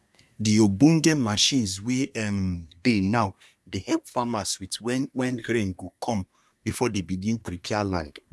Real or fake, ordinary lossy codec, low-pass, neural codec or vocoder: fake; none; none; codec, 24 kHz, 1 kbps, SNAC